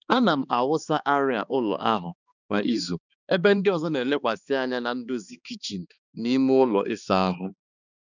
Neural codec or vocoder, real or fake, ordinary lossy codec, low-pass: codec, 16 kHz, 2 kbps, X-Codec, HuBERT features, trained on balanced general audio; fake; none; 7.2 kHz